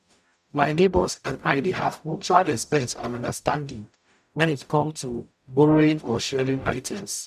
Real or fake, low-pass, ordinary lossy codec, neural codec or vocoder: fake; 14.4 kHz; none; codec, 44.1 kHz, 0.9 kbps, DAC